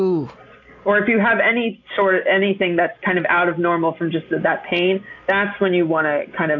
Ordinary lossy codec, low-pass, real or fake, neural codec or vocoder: AAC, 48 kbps; 7.2 kHz; real; none